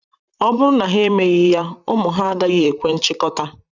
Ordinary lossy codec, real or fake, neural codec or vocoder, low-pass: none; fake; vocoder, 44.1 kHz, 128 mel bands, Pupu-Vocoder; 7.2 kHz